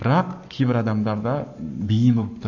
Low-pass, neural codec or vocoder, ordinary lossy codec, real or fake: 7.2 kHz; codec, 44.1 kHz, 7.8 kbps, Pupu-Codec; none; fake